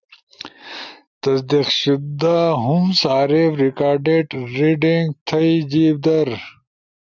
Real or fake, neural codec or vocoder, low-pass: real; none; 7.2 kHz